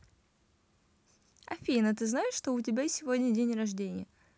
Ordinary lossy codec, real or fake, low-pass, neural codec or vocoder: none; real; none; none